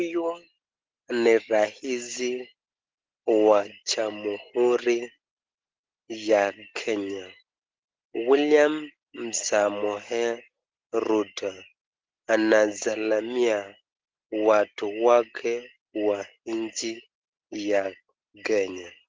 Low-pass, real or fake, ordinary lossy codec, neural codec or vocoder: 7.2 kHz; real; Opus, 16 kbps; none